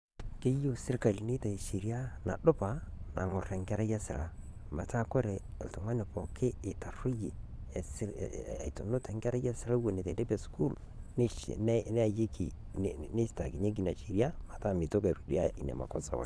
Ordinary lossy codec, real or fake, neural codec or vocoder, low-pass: none; fake; vocoder, 22.05 kHz, 80 mel bands, Vocos; none